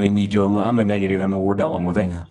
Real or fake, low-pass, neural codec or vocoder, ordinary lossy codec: fake; 10.8 kHz; codec, 24 kHz, 0.9 kbps, WavTokenizer, medium music audio release; none